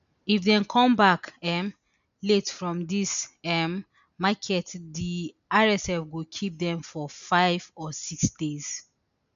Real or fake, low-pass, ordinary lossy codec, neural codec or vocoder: real; 7.2 kHz; none; none